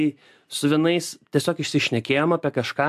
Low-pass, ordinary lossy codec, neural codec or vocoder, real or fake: 14.4 kHz; AAC, 96 kbps; none; real